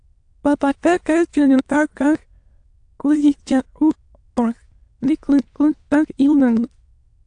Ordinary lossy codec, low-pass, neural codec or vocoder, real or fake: AAC, 64 kbps; 9.9 kHz; autoencoder, 22.05 kHz, a latent of 192 numbers a frame, VITS, trained on many speakers; fake